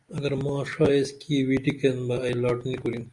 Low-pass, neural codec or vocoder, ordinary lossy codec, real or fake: 10.8 kHz; none; Opus, 64 kbps; real